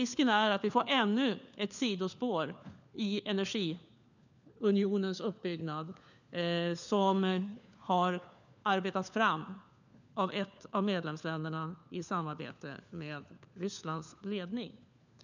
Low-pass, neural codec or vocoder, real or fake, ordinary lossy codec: 7.2 kHz; codec, 16 kHz, 4 kbps, FunCodec, trained on LibriTTS, 50 frames a second; fake; none